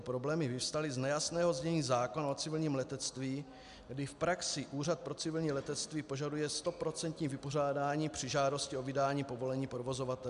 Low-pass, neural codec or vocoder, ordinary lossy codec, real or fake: 10.8 kHz; none; MP3, 96 kbps; real